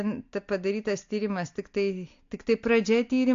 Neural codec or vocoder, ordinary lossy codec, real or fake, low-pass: none; AAC, 48 kbps; real; 7.2 kHz